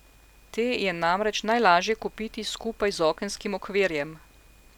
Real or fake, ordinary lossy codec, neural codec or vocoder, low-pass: real; none; none; 19.8 kHz